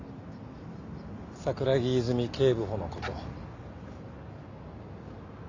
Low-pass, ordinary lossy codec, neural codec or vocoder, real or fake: 7.2 kHz; AAC, 48 kbps; none; real